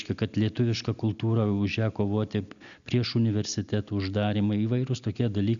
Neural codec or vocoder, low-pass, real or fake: none; 7.2 kHz; real